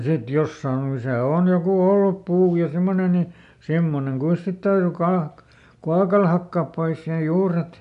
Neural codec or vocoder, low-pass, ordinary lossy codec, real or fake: none; 10.8 kHz; none; real